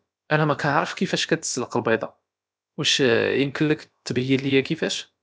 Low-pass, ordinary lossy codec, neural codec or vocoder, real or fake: none; none; codec, 16 kHz, about 1 kbps, DyCAST, with the encoder's durations; fake